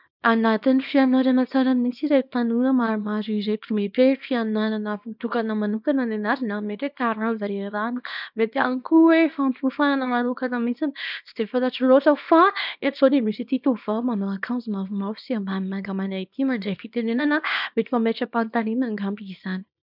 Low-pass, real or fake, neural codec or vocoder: 5.4 kHz; fake; codec, 24 kHz, 0.9 kbps, WavTokenizer, small release